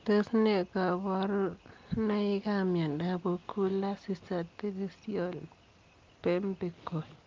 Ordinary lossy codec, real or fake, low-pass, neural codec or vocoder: Opus, 32 kbps; real; 7.2 kHz; none